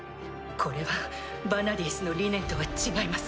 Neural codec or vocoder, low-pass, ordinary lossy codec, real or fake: none; none; none; real